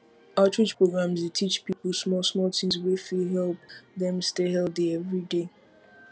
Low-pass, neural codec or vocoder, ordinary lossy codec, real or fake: none; none; none; real